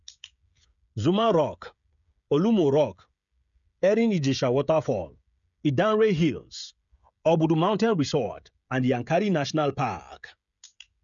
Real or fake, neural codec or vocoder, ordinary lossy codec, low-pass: fake; codec, 16 kHz, 16 kbps, FreqCodec, smaller model; none; 7.2 kHz